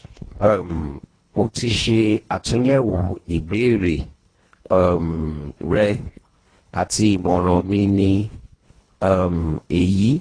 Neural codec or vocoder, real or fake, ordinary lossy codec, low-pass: codec, 24 kHz, 1.5 kbps, HILCodec; fake; AAC, 32 kbps; 9.9 kHz